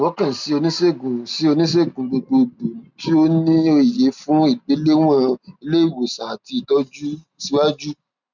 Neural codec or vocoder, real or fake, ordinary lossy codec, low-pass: none; real; none; 7.2 kHz